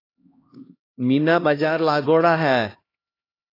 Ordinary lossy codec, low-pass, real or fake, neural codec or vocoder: AAC, 24 kbps; 5.4 kHz; fake; codec, 16 kHz, 1 kbps, X-Codec, HuBERT features, trained on LibriSpeech